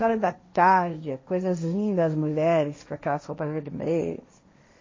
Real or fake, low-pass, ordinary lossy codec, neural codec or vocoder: fake; 7.2 kHz; MP3, 32 kbps; codec, 16 kHz, 1.1 kbps, Voila-Tokenizer